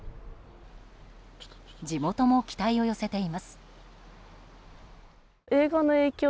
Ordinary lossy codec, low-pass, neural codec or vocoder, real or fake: none; none; none; real